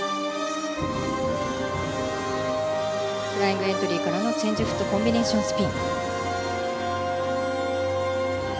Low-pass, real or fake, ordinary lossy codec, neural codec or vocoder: none; real; none; none